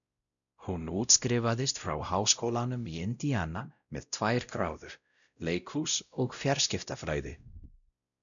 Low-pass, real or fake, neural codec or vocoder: 7.2 kHz; fake; codec, 16 kHz, 0.5 kbps, X-Codec, WavLM features, trained on Multilingual LibriSpeech